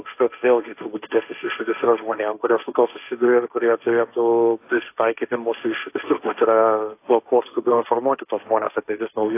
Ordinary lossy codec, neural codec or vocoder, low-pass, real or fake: AAC, 24 kbps; codec, 16 kHz, 1.1 kbps, Voila-Tokenizer; 3.6 kHz; fake